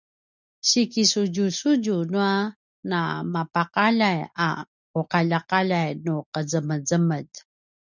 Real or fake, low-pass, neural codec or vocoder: real; 7.2 kHz; none